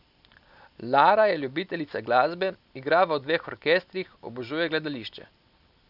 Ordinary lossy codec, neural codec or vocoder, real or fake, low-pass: none; none; real; 5.4 kHz